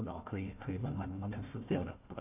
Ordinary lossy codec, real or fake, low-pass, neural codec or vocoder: none; fake; 3.6 kHz; codec, 16 kHz, 1 kbps, FunCodec, trained on Chinese and English, 50 frames a second